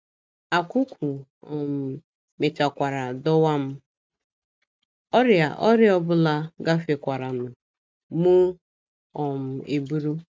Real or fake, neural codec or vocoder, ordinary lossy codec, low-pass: real; none; none; none